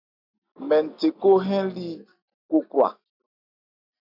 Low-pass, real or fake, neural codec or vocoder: 5.4 kHz; real; none